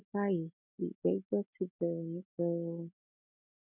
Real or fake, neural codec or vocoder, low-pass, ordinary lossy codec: real; none; 3.6 kHz; none